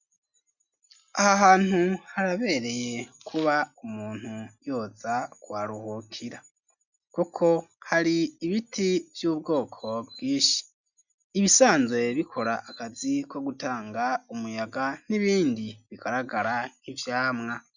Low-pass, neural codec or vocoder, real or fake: 7.2 kHz; none; real